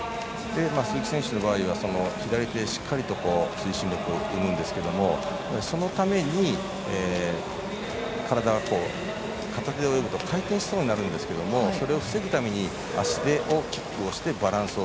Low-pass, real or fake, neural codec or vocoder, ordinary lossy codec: none; real; none; none